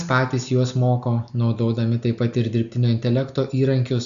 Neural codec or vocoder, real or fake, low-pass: none; real; 7.2 kHz